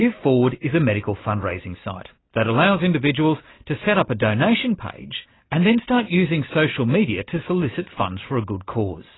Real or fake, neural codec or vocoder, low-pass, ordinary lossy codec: real; none; 7.2 kHz; AAC, 16 kbps